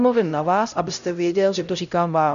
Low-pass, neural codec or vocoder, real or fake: 7.2 kHz; codec, 16 kHz, 0.5 kbps, X-Codec, HuBERT features, trained on LibriSpeech; fake